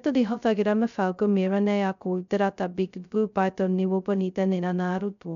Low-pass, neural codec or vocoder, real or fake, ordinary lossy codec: 7.2 kHz; codec, 16 kHz, 0.2 kbps, FocalCodec; fake; none